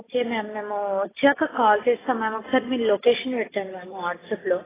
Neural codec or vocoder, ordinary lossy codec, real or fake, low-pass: none; AAC, 16 kbps; real; 3.6 kHz